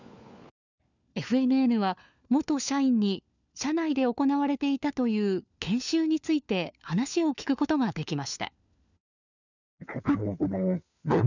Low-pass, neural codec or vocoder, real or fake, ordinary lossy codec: 7.2 kHz; codec, 16 kHz, 4 kbps, FunCodec, trained on LibriTTS, 50 frames a second; fake; none